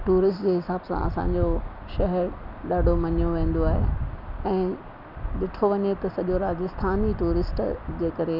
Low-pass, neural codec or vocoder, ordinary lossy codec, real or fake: 5.4 kHz; none; none; real